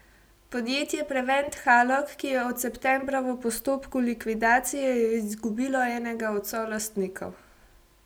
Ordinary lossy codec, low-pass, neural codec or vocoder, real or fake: none; none; none; real